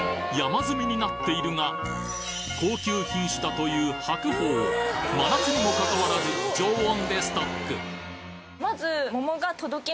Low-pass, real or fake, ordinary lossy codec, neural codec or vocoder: none; real; none; none